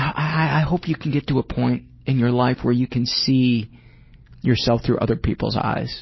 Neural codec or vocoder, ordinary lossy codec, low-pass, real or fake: none; MP3, 24 kbps; 7.2 kHz; real